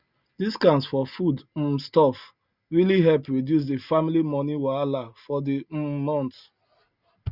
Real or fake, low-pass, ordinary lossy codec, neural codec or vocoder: real; 5.4 kHz; Opus, 64 kbps; none